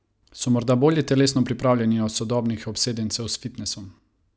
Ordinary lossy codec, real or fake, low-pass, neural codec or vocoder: none; real; none; none